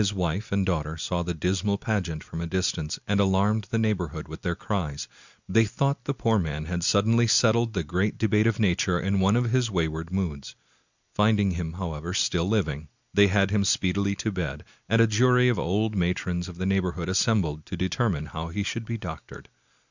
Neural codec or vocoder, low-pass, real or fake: none; 7.2 kHz; real